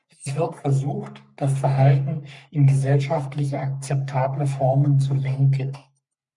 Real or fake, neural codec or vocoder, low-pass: fake; codec, 44.1 kHz, 3.4 kbps, Pupu-Codec; 10.8 kHz